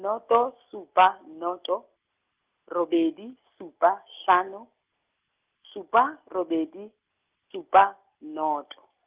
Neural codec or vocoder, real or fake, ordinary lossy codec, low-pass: none; real; Opus, 32 kbps; 3.6 kHz